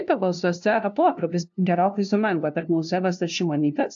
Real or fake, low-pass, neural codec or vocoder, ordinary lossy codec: fake; 7.2 kHz; codec, 16 kHz, 0.5 kbps, FunCodec, trained on LibriTTS, 25 frames a second; AAC, 64 kbps